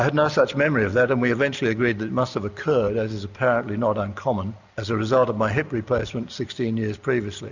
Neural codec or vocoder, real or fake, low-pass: none; real; 7.2 kHz